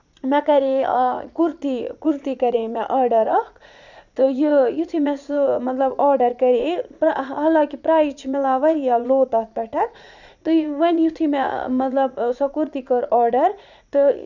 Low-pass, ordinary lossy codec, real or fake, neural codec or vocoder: 7.2 kHz; none; fake; vocoder, 44.1 kHz, 80 mel bands, Vocos